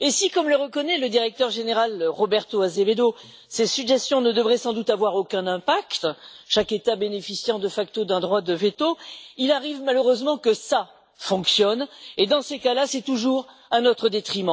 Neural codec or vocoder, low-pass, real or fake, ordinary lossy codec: none; none; real; none